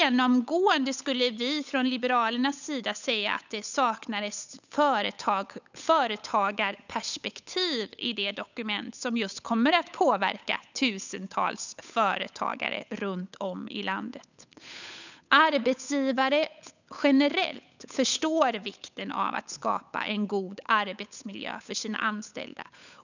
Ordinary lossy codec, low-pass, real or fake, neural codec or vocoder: none; 7.2 kHz; fake; codec, 16 kHz, 8 kbps, FunCodec, trained on Chinese and English, 25 frames a second